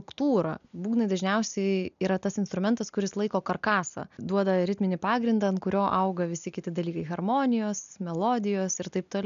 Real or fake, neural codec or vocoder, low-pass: real; none; 7.2 kHz